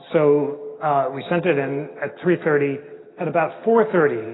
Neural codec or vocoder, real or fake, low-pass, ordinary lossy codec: none; real; 7.2 kHz; AAC, 16 kbps